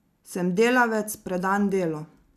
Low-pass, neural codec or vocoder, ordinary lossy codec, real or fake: 14.4 kHz; none; none; real